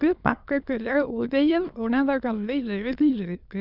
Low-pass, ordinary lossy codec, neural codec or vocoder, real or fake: 5.4 kHz; none; autoencoder, 22.05 kHz, a latent of 192 numbers a frame, VITS, trained on many speakers; fake